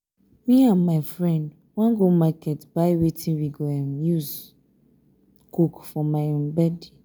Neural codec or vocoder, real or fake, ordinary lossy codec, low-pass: none; real; none; none